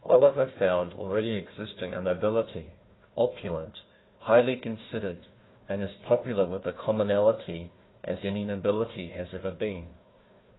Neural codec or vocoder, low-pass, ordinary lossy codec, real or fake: codec, 16 kHz, 1 kbps, FunCodec, trained on Chinese and English, 50 frames a second; 7.2 kHz; AAC, 16 kbps; fake